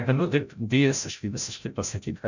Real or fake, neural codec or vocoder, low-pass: fake; codec, 16 kHz, 0.5 kbps, FreqCodec, larger model; 7.2 kHz